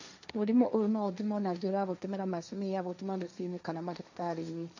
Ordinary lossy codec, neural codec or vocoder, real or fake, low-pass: none; codec, 16 kHz, 1.1 kbps, Voila-Tokenizer; fake; 7.2 kHz